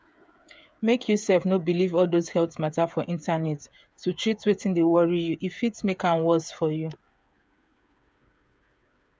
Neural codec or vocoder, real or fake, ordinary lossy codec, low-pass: codec, 16 kHz, 8 kbps, FreqCodec, smaller model; fake; none; none